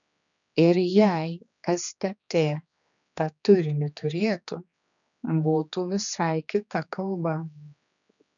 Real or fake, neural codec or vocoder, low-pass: fake; codec, 16 kHz, 2 kbps, X-Codec, HuBERT features, trained on general audio; 7.2 kHz